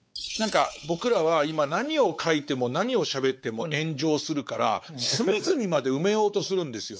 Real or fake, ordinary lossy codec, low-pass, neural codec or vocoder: fake; none; none; codec, 16 kHz, 4 kbps, X-Codec, WavLM features, trained on Multilingual LibriSpeech